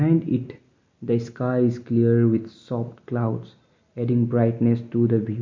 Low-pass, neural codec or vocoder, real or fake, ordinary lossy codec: 7.2 kHz; none; real; MP3, 48 kbps